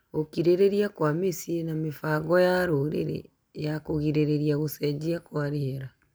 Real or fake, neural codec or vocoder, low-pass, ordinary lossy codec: real; none; none; none